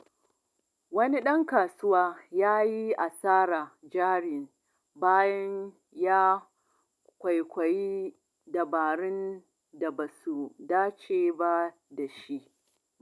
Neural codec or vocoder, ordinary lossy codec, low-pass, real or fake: none; none; none; real